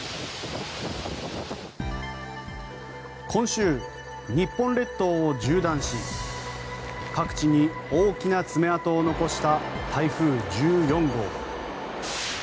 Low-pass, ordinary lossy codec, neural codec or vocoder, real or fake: none; none; none; real